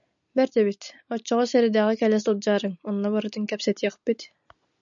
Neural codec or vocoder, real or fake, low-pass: none; real; 7.2 kHz